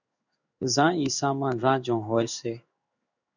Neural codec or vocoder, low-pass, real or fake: codec, 16 kHz in and 24 kHz out, 1 kbps, XY-Tokenizer; 7.2 kHz; fake